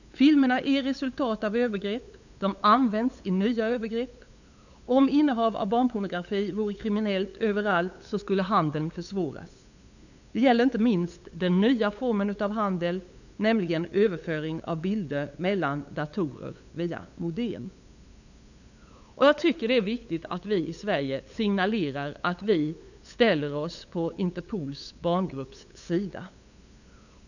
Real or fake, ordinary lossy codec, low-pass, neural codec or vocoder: fake; none; 7.2 kHz; codec, 16 kHz, 8 kbps, FunCodec, trained on LibriTTS, 25 frames a second